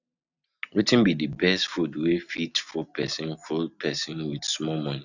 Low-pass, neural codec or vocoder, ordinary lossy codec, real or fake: 7.2 kHz; none; none; real